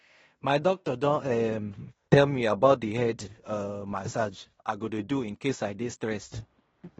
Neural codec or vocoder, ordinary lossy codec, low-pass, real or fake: codec, 16 kHz in and 24 kHz out, 0.9 kbps, LongCat-Audio-Codec, fine tuned four codebook decoder; AAC, 24 kbps; 10.8 kHz; fake